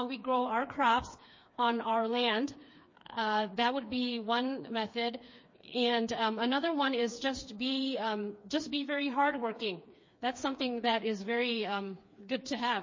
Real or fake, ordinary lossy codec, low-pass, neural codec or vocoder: fake; MP3, 32 kbps; 7.2 kHz; codec, 16 kHz, 4 kbps, FreqCodec, smaller model